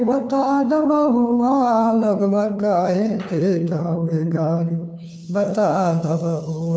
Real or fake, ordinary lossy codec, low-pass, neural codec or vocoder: fake; none; none; codec, 16 kHz, 2 kbps, FunCodec, trained on LibriTTS, 25 frames a second